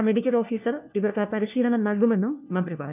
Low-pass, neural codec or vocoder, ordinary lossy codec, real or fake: 3.6 kHz; codec, 16 kHz, 1 kbps, FunCodec, trained on LibriTTS, 50 frames a second; none; fake